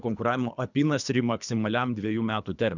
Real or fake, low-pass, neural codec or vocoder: fake; 7.2 kHz; codec, 24 kHz, 3 kbps, HILCodec